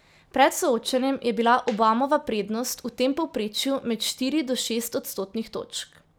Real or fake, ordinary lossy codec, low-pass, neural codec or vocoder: real; none; none; none